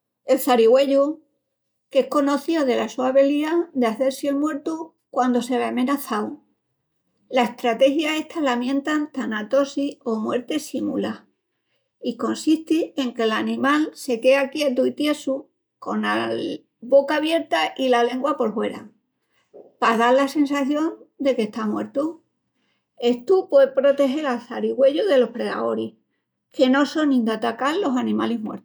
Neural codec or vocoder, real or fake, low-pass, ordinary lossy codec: none; real; none; none